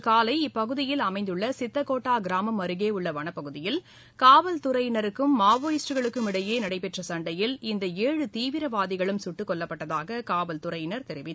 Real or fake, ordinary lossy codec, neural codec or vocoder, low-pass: real; none; none; none